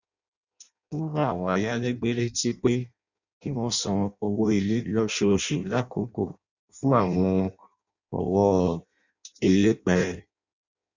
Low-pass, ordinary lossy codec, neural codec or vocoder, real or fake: 7.2 kHz; none; codec, 16 kHz in and 24 kHz out, 0.6 kbps, FireRedTTS-2 codec; fake